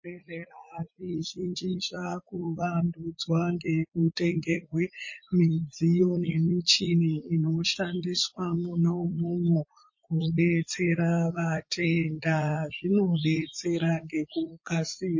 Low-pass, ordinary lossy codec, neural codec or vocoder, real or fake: 7.2 kHz; MP3, 32 kbps; vocoder, 44.1 kHz, 80 mel bands, Vocos; fake